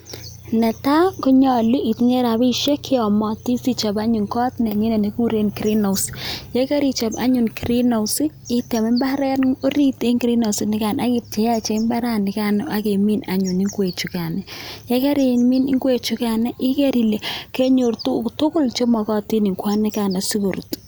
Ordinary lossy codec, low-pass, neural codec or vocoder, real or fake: none; none; none; real